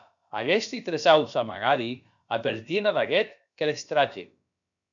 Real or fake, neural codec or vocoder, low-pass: fake; codec, 16 kHz, about 1 kbps, DyCAST, with the encoder's durations; 7.2 kHz